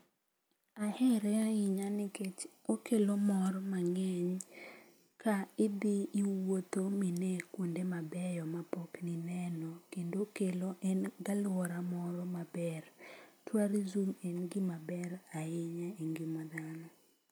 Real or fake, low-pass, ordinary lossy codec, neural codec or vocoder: real; none; none; none